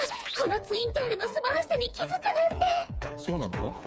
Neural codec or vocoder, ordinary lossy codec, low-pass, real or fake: codec, 16 kHz, 4 kbps, FreqCodec, smaller model; none; none; fake